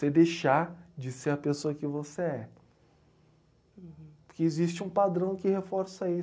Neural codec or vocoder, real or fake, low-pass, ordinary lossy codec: none; real; none; none